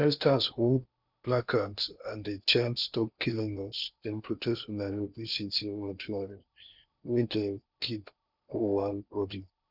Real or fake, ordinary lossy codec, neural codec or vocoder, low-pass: fake; none; codec, 16 kHz in and 24 kHz out, 0.8 kbps, FocalCodec, streaming, 65536 codes; 5.4 kHz